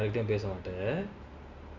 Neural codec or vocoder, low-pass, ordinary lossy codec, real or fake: none; 7.2 kHz; Opus, 64 kbps; real